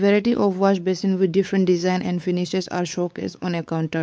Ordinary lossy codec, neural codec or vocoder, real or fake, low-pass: none; codec, 16 kHz, 4 kbps, X-Codec, WavLM features, trained on Multilingual LibriSpeech; fake; none